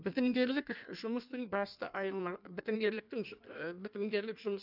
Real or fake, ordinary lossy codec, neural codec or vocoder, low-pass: fake; none; codec, 16 kHz in and 24 kHz out, 1.1 kbps, FireRedTTS-2 codec; 5.4 kHz